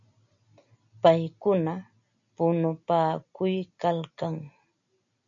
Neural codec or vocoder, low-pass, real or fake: none; 7.2 kHz; real